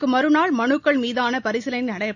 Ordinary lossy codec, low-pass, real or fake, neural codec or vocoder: none; 7.2 kHz; real; none